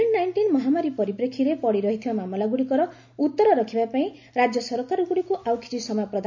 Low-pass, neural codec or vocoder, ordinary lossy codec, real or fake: 7.2 kHz; none; MP3, 64 kbps; real